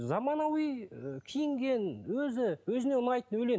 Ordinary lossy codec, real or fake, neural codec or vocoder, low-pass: none; real; none; none